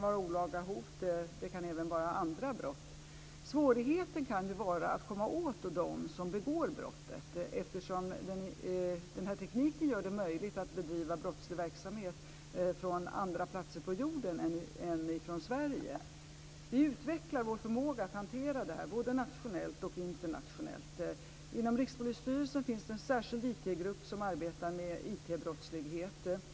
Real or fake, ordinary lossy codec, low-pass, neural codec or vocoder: real; none; none; none